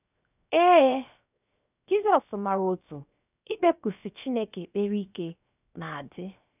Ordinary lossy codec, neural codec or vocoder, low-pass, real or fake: none; codec, 16 kHz, 0.7 kbps, FocalCodec; 3.6 kHz; fake